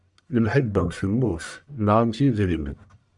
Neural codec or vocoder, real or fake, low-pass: codec, 44.1 kHz, 1.7 kbps, Pupu-Codec; fake; 10.8 kHz